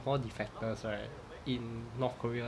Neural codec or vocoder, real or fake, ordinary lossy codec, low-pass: none; real; none; none